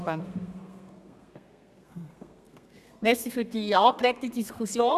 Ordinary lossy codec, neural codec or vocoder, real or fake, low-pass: none; codec, 32 kHz, 1.9 kbps, SNAC; fake; 14.4 kHz